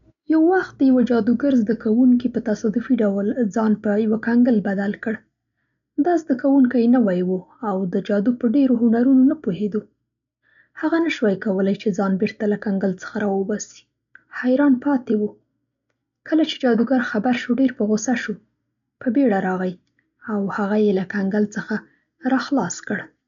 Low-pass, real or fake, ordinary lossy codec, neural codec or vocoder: 7.2 kHz; real; none; none